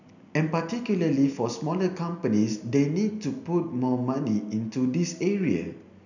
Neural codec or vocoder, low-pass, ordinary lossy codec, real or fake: none; 7.2 kHz; none; real